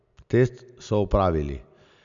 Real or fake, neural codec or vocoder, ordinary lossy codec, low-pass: real; none; none; 7.2 kHz